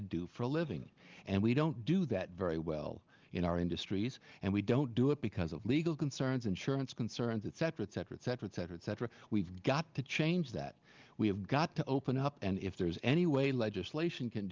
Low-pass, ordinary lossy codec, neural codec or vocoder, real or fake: 7.2 kHz; Opus, 32 kbps; none; real